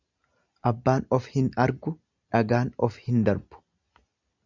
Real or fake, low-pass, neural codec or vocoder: real; 7.2 kHz; none